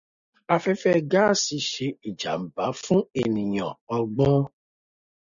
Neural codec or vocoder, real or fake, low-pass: none; real; 7.2 kHz